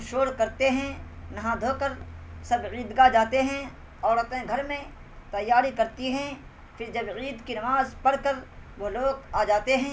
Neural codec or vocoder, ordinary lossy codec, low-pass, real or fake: none; none; none; real